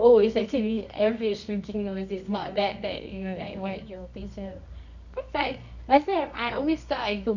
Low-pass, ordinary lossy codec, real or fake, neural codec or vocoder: 7.2 kHz; none; fake; codec, 24 kHz, 0.9 kbps, WavTokenizer, medium music audio release